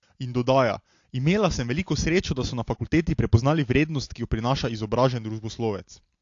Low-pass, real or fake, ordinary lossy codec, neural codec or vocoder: 7.2 kHz; real; AAC, 48 kbps; none